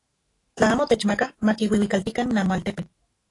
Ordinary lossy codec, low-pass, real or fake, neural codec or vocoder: AAC, 32 kbps; 10.8 kHz; fake; autoencoder, 48 kHz, 128 numbers a frame, DAC-VAE, trained on Japanese speech